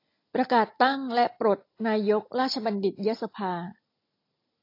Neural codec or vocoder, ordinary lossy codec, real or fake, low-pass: none; AAC, 32 kbps; real; 5.4 kHz